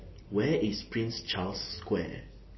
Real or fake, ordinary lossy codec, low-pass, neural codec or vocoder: real; MP3, 24 kbps; 7.2 kHz; none